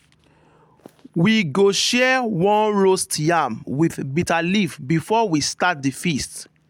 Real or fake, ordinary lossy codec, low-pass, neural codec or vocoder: real; MP3, 96 kbps; 19.8 kHz; none